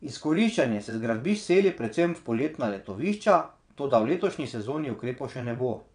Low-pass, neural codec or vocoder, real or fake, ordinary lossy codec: 9.9 kHz; vocoder, 22.05 kHz, 80 mel bands, WaveNeXt; fake; none